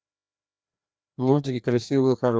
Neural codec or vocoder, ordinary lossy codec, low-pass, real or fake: codec, 16 kHz, 1 kbps, FreqCodec, larger model; none; none; fake